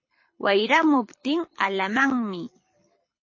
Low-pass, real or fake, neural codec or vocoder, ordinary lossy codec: 7.2 kHz; fake; codec, 24 kHz, 6 kbps, HILCodec; MP3, 32 kbps